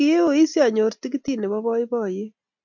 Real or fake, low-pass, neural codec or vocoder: real; 7.2 kHz; none